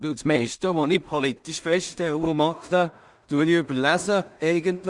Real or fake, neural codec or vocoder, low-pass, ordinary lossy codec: fake; codec, 16 kHz in and 24 kHz out, 0.4 kbps, LongCat-Audio-Codec, two codebook decoder; 10.8 kHz; Opus, 64 kbps